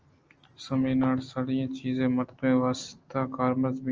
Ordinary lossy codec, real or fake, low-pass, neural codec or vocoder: Opus, 24 kbps; real; 7.2 kHz; none